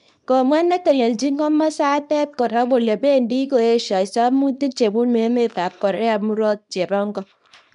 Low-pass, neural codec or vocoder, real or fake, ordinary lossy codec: 10.8 kHz; codec, 24 kHz, 0.9 kbps, WavTokenizer, small release; fake; none